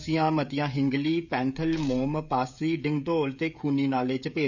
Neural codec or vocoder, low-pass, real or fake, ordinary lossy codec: codec, 16 kHz, 16 kbps, FreqCodec, smaller model; 7.2 kHz; fake; none